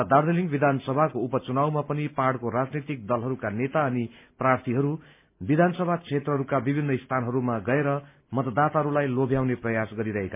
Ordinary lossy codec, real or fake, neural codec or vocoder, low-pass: MP3, 32 kbps; real; none; 3.6 kHz